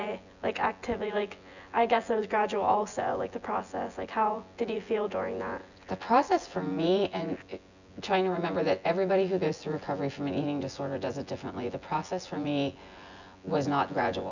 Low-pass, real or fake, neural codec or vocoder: 7.2 kHz; fake; vocoder, 24 kHz, 100 mel bands, Vocos